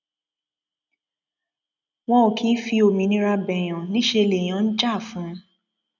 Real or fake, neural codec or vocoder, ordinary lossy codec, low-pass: real; none; none; 7.2 kHz